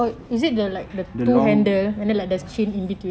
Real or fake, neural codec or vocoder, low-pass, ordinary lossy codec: real; none; none; none